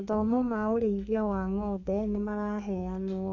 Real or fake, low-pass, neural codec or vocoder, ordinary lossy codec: fake; 7.2 kHz; codec, 32 kHz, 1.9 kbps, SNAC; none